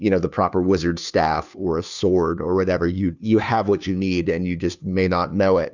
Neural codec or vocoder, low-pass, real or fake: codec, 16 kHz, 2 kbps, FunCodec, trained on Chinese and English, 25 frames a second; 7.2 kHz; fake